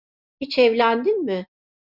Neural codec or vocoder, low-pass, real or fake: none; 5.4 kHz; real